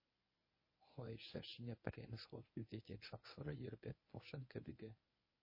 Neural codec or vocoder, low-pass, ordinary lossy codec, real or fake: codec, 24 kHz, 0.9 kbps, WavTokenizer, medium speech release version 1; 5.4 kHz; MP3, 24 kbps; fake